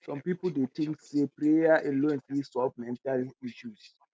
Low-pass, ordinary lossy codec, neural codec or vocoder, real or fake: none; none; none; real